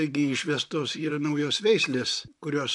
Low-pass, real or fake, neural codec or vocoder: 10.8 kHz; fake; vocoder, 44.1 kHz, 128 mel bands, Pupu-Vocoder